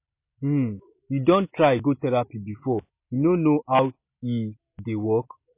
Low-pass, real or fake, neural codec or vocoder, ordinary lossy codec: 3.6 kHz; real; none; MP3, 24 kbps